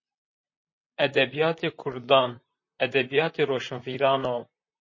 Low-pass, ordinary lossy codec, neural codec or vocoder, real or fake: 7.2 kHz; MP3, 32 kbps; vocoder, 44.1 kHz, 128 mel bands, Pupu-Vocoder; fake